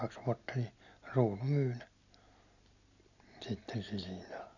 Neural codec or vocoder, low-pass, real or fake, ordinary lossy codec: none; 7.2 kHz; real; none